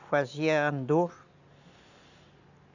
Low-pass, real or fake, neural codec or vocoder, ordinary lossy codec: 7.2 kHz; real; none; none